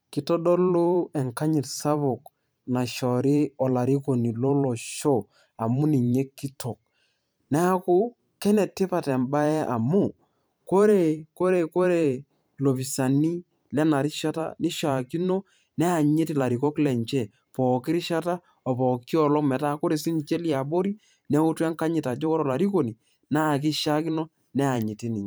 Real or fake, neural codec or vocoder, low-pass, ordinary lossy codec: fake; vocoder, 44.1 kHz, 128 mel bands every 512 samples, BigVGAN v2; none; none